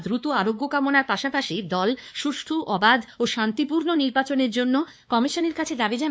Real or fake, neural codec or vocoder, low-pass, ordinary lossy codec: fake; codec, 16 kHz, 2 kbps, X-Codec, WavLM features, trained on Multilingual LibriSpeech; none; none